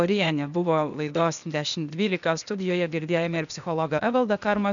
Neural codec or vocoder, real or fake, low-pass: codec, 16 kHz, 0.8 kbps, ZipCodec; fake; 7.2 kHz